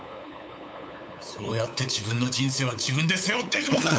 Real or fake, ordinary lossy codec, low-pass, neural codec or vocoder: fake; none; none; codec, 16 kHz, 8 kbps, FunCodec, trained on LibriTTS, 25 frames a second